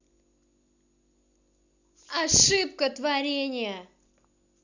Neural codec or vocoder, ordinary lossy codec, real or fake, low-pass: none; none; real; 7.2 kHz